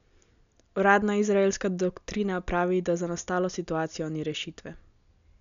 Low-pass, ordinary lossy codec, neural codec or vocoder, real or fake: 7.2 kHz; none; none; real